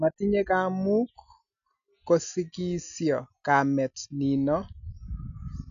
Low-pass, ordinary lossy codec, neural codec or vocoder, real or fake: 7.2 kHz; none; none; real